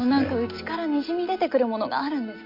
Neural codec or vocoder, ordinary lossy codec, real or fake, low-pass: none; MP3, 32 kbps; real; 5.4 kHz